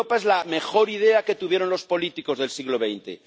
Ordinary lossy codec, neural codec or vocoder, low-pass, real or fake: none; none; none; real